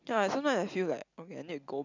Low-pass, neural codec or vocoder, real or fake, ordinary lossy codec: 7.2 kHz; none; real; none